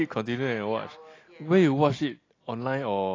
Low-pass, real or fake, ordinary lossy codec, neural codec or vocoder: 7.2 kHz; real; AAC, 32 kbps; none